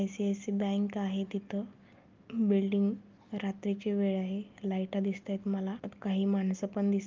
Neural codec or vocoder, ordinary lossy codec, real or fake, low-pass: none; Opus, 32 kbps; real; 7.2 kHz